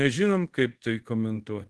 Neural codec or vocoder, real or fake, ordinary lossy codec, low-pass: codec, 24 kHz, 0.5 kbps, DualCodec; fake; Opus, 16 kbps; 10.8 kHz